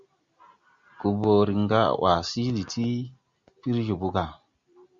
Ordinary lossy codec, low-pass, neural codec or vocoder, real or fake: Opus, 64 kbps; 7.2 kHz; none; real